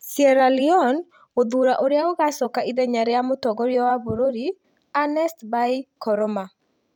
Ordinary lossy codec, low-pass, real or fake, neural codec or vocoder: none; 19.8 kHz; fake; vocoder, 44.1 kHz, 128 mel bands every 512 samples, BigVGAN v2